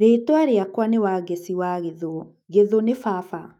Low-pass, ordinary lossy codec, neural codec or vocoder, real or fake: 19.8 kHz; none; autoencoder, 48 kHz, 128 numbers a frame, DAC-VAE, trained on Japanese speech; fake